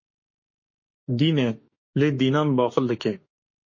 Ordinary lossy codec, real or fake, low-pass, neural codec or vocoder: MP3, 32 kbps; fake; 7.2 kHz; autoencoder, 48 kHz, 32 numbers a frame, DAC-VAE, trained on Japanese speech